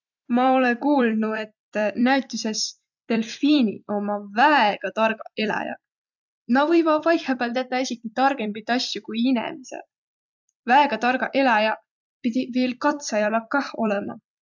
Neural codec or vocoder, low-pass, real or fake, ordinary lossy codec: vocoder, 44.1 kHz, 128 mel bands every 256 samples, BigVGAN v2; 7.2 kHz; fake; none